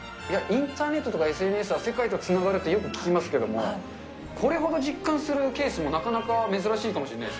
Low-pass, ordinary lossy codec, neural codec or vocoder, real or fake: none; none; none; real